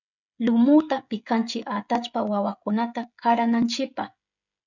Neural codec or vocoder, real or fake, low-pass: codec, 16 kHz, 16 kbps, FreqCodec, smaller model; fake; 7.2 kHz